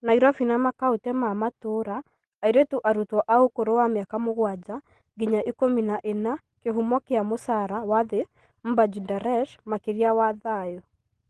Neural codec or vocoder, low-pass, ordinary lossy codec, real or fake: none; 10.8 kHz; Opus, 16 kbps; real